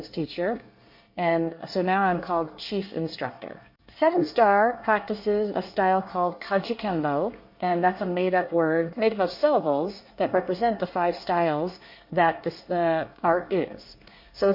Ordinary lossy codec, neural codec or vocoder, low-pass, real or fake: MP3, 32 kbps; codec, 24 kHz, 1 kbps, SNAC; 5.4 kHz; fake